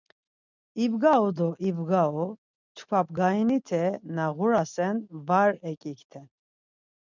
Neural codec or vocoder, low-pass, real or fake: none; 7.2 kHz; real